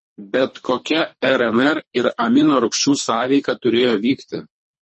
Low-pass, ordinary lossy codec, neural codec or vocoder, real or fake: 10.8 kHz; MP3, 32 kbps; codec, 24 kHz, 3 kbps, HILCodec; fake